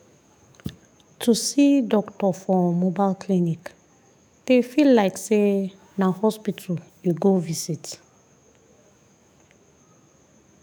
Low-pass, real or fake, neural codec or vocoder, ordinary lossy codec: none; fake; autoencoder, 48 kHz, 128 numbers a frame, DAC-VAE, trained on Japanese speech; none